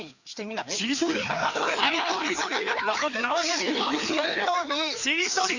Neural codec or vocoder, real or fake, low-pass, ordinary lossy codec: codec, 16 kHz, 2 kbps, FreqCodec, larger model; fake; 7.2 kHz; none